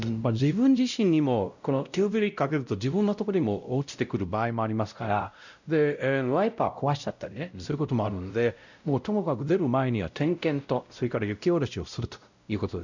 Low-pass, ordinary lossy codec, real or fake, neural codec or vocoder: 7.2 kHz; none; fake; codec, 16 kHz, 0.5 kbps, X-Codec, WavLM features, trained on Multilingual LibriSpeech